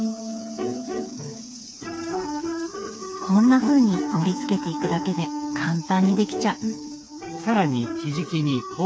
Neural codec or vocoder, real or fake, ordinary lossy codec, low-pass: codec, 16 kHz, 4 kbps, FreqCodec, smaller model; fake; none; none